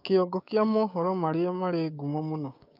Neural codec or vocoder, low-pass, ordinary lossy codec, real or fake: codec, 16 kHz, 6 kbps, DAC; 5.4 kHz; none; fake